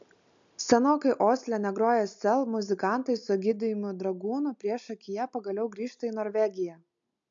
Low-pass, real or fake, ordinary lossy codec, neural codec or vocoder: 7.2 kHz; real; AAC, 64 kbps; none